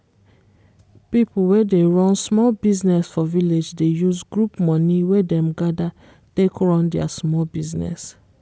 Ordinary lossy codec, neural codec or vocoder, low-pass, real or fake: none; none; none; real